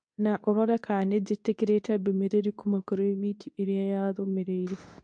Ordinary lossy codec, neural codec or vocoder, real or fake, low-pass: MP3, 64 kbps; codec, 24 kHz, 0.9 kbps, WavTokenizer, medium speech release version 2; fake; 9.9 kHz